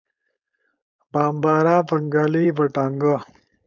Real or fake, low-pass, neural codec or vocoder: fake; 7.2 kHz; codec, 16 kHz, 4.8 kbps, FACodec